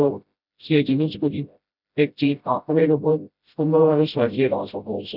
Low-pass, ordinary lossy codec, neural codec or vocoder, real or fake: 5.4 kHz; none; codec, 16 kHz, 0.5 kbps, FreqCodec, smaller model; fake